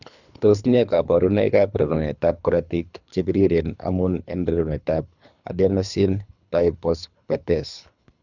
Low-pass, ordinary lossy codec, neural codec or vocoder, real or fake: 7.2 kHz; none; codec, 24 kHz, 3 kbps, HILCodec; fake